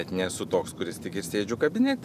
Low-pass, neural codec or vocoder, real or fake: 14.4 kHz; none; real